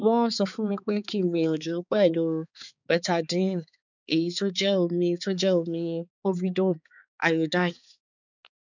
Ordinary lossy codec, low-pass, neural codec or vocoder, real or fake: none; 7.2 kHz; codec, 16 kHz, 4 kbps, X-Codec, HuBERT features, trained on balanced general audio; fake